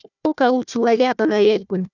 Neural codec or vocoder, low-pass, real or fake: codec, 16 kHz, 1 kbps, FunCodec, trained on Chinese and English, 50 frames a second; 7.2 kHz; fake